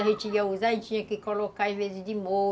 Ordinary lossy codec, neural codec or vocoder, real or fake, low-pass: none; none; real; none